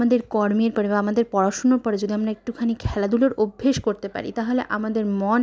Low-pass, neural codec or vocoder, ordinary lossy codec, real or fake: none; none; none; real